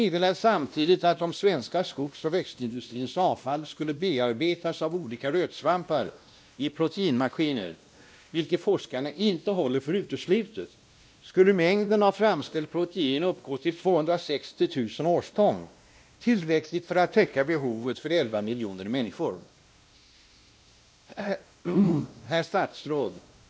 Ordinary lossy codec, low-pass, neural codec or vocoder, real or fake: none; none; codec, 16 kHz, 1 kbps, X-Codec, WavLM features, trained on Multilingual LibriSpeech; fake